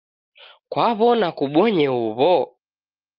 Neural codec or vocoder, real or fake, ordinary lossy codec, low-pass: none; real; Opus, 32 kbps; 5.4 kHz